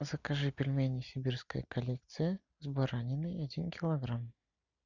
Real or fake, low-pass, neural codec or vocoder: real; 7.2 kHz; none